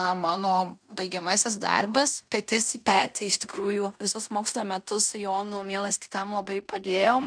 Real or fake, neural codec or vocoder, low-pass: fake; codec, 16 kHz in and 24 kHz out, 0.9 kbps, LongCat-Audio-Codec, fine tuned four codebook decoder; 9.9 kHz